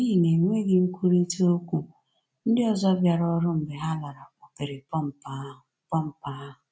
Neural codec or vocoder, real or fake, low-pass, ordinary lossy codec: none; real; none; none